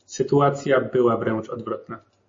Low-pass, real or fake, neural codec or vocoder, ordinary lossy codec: 7.2 kHz; real; none; MP3, 32 kbps